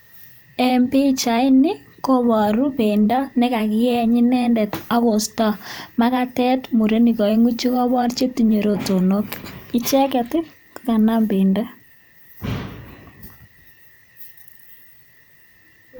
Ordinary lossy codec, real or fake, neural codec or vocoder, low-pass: none; fake; vocoder, 44.1 kHz, 128 mel bands every 512 samples, BigVGAN v2; none